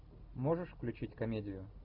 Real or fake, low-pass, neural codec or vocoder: real; 5.4 kHz; none